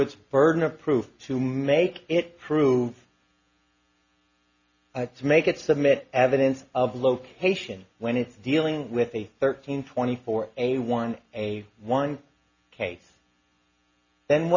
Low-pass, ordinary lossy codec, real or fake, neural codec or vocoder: 7.2 kHz; Opus, 64 kbps; real; none